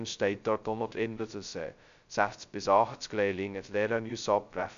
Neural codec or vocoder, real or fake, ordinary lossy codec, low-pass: codec, 16 kHz, 0.2 kbps, FocalCodec; fake; MP3, 64 kbps; 7.2 kHz